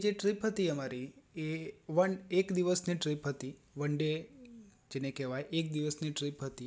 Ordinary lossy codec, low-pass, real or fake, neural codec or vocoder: none; none; real; none